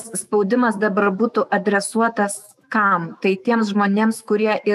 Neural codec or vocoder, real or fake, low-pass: autoencoder, 48 kHz, 128 numbers a frame, DAC-VAE, trained on Japanese speech; fake; 14.4 kHz